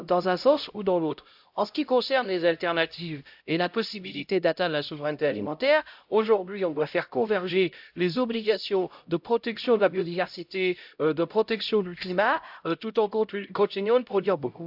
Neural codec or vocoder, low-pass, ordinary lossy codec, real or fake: codec, 16 kHz, 0.5 kbps, X-Codec, HuBERT features, trained on LibriSpeech; 5.4 kHz; AAC, 48 kbps; fake